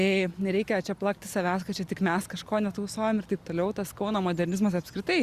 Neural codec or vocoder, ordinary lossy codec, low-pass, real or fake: none; Opus, 64 kbps; 14.4 kHz; real